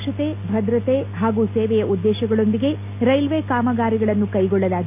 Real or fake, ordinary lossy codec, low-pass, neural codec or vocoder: real; none; 3.6 kHz; none